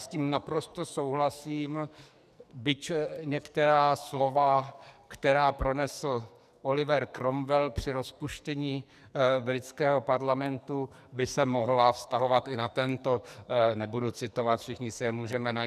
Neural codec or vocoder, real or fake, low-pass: codec, 44.1 kHz, 2.6 kbps, SNAC; fake; 14.4 kHz